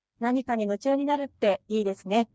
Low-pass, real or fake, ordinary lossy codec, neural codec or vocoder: none; fake; none; codec, 16 kHz, 2 kbps, FreqCodec, smaller model